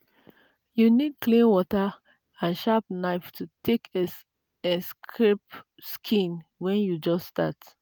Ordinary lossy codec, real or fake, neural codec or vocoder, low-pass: none; real; none; none